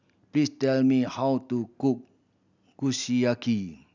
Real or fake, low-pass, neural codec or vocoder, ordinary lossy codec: real; 7.2 kHz; none; none